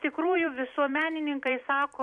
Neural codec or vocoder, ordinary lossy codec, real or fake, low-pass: vocoder, 44.1 kHz, 128 mel bands every 256 samples, BigVGAN v2; MP3, 64 kbps; fake; 10.8 kHz